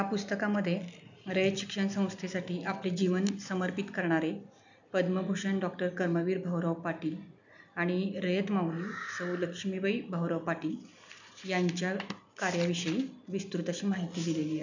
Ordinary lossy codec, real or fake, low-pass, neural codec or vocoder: none; real; 7.2 kHz; none